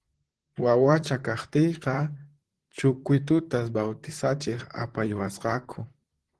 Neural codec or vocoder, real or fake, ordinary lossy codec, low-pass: vocoder, 44.1 kHz, 128 mel bands, Pupu-Vocoder; fake; Opus, 16 kbps; 10.8 kHz